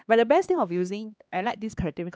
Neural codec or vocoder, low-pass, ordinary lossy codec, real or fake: codec, 16 kHz, 2 kbps, X-Codec, HuBERT features, trained on LibriSpeech; none; none; fake